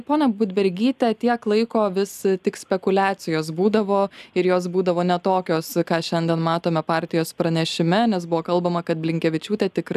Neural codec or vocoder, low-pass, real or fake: none; 14.4 kHz; real